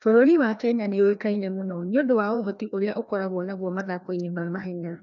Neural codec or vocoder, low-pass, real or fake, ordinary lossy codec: codec, 16 kHz, 1 kbps, FreqCodec, larger model; 7.2 kHz; fake; none